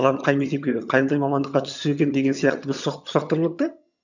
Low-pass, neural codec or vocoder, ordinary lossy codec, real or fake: 7.2 kHz; vocoder, 22.05 kHz, 80 mel bands, HiFi-GAN; none; fake